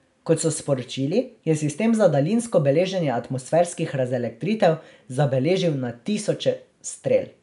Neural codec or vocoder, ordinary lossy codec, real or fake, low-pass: none; none; real; 10.8 kHz